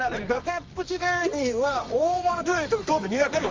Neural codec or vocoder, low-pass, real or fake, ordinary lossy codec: codec, 24 kHz, 0.9 kbps, WavTokenizer, medium music audio release; 7.2 kHz; fake; Opus, 32 kbps